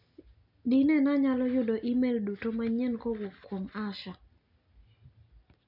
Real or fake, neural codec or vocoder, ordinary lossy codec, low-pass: real; none; none; 5.4 kHz